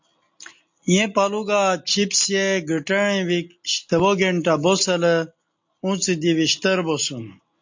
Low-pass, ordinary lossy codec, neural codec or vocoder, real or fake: 7.2 kHz; MP3, 48 kbps; none; real